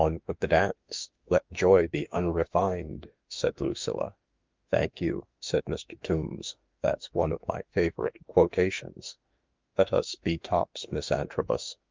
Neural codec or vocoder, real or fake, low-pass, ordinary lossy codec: autoencoder, 48 kHz, 32 numbers a frame, DAC-VAE, trained on Japanese speech; fake; 7.2 kHz; Opus, 24 kbps